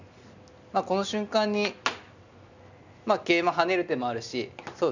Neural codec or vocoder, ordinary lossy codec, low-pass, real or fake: none; none; 7.2 kHz; real